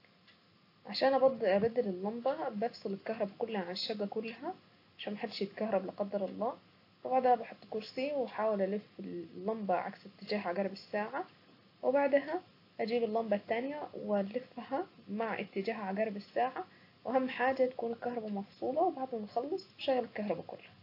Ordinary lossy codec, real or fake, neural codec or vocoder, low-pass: AAC, 32 kbps; real; none; 5.4 kHz